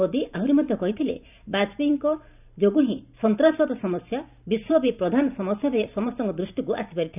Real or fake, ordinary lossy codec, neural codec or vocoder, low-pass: fake; none; vocoder, 44.1 kHz, 128 mel bands every 512 samples, BigVGAN v2; 3.6 kHz